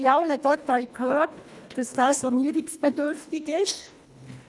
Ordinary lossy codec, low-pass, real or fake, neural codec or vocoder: none; none; fake; codec, 24 kHz, 1.5 kbps, HILCodec